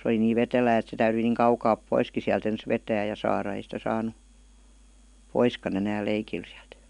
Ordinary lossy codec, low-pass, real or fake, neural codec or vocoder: none; 10.8 kHz; real; none